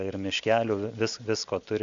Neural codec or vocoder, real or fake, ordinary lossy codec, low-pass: none; real; Opus, 64 kbps; 7.2 kHz